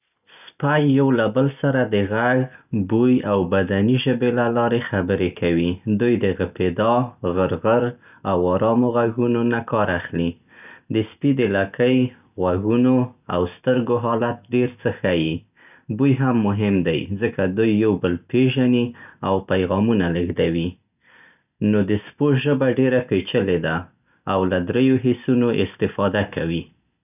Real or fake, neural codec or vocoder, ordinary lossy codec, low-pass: real; none; none; 3.6 kHz